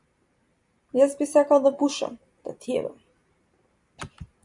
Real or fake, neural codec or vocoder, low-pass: fake; vocoder, 44.1 kHz, 128 mel bands every 256 samples, BigVGAN v2; 10.8 kHz